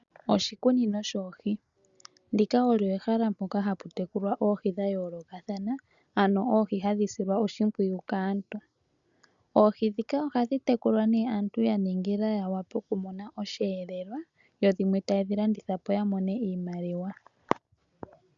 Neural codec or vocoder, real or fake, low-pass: none; real; 7.2 kHz